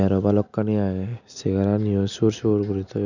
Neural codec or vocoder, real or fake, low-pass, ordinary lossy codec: none; real; 7.2 kHz; none